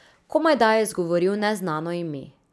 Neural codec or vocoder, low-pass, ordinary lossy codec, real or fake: none; none; none; real